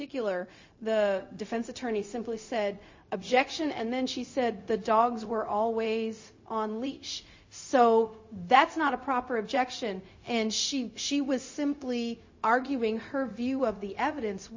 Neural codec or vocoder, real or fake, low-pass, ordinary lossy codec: codec, 16 kHz, 0.4 kbps, LongCat-Audio-Codec; fake; 7.2 kHz; MP3, 32 kbps